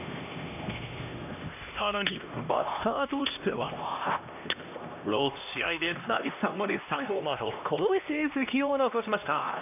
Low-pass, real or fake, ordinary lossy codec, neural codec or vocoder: 3.6 kHz; fake; none; codec, 16 kHz, 1 kbps, X-Codec, HuBERT features, trained on LibriSpeech